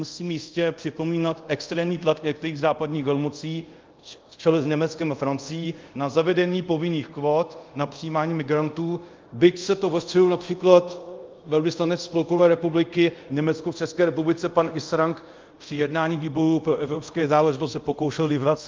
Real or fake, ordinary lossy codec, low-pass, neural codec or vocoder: fake; Opus, 24 kbps; 7.2 kHz; codec, 24 kHz, 0.5 kbps, DualCodec